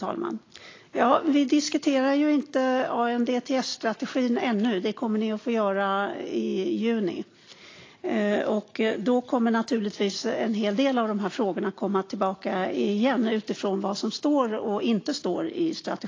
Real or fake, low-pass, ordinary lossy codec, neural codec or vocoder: real; 7.2 kHz; AAC, 32 kbps; none